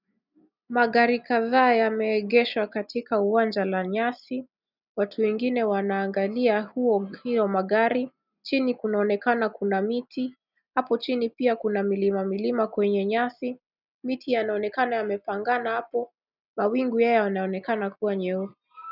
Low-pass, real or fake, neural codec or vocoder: 5.4 kHz; real; none